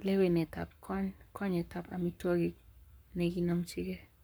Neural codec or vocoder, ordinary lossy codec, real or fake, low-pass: codec, 44.1 kHz, 7.8 kbps, Pupu-Codec; none; fake; none